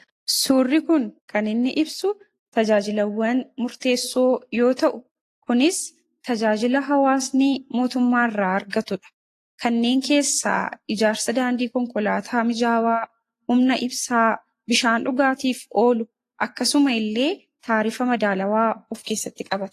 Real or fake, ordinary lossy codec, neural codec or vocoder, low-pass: real; AAC, 48 kbps; none; 14.4 kHz